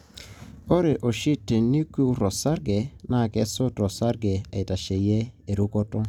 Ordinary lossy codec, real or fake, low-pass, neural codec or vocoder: none; real; 19.8 kHz; none